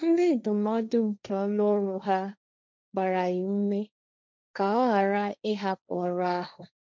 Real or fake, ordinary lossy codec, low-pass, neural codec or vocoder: fake; none; none; codec, 16 kHz, 1.1 kbps, Voila-Tokenizer